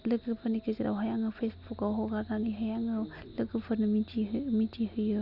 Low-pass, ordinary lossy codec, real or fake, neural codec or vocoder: 5.4 kHz; none; real; none